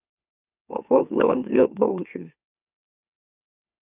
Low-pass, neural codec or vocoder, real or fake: 3.6 kHz; autoencoder, 44.1 kHz, a latent of 192 numbers a frame, MeloTTS; fake